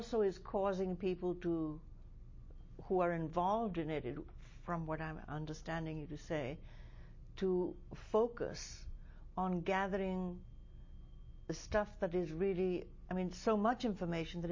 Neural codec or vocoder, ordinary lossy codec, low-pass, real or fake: none; MP3, 32 kbps; 7.2 kHz; real